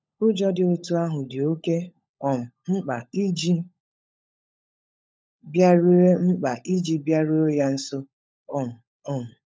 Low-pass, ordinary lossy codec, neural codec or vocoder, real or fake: none; none; codec, 16 kHz, 16 kbps, FunCodec, trained on LibriTTS, 50 frames a second; fake